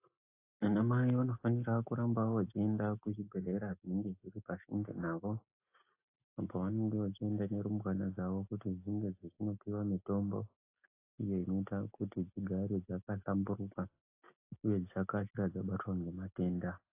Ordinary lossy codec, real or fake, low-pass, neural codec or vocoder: AAC, 24 kbps; real; 3.6 kHz; none